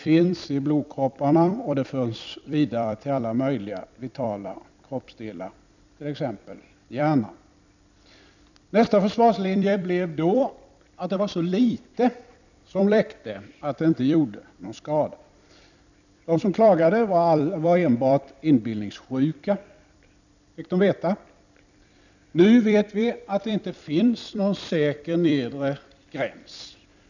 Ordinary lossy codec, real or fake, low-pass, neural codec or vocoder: none; fake; 7.2 kHz; vocoder, 44.1 kHz, 128 mel bands every 256 samples, BigVGAN v2